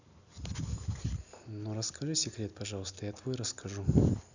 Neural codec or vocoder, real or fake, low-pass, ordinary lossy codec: none; real; 7.2 kHz; none